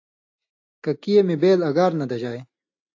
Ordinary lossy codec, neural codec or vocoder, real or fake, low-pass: AAC, 48 kbps; none; real; 7.2 kHz